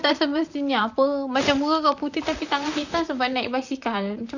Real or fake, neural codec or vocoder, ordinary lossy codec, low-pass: fake; vocoder, 44.1 kHz, 128 mel bands, Pupu-Vocoder; none; 7.2 kHz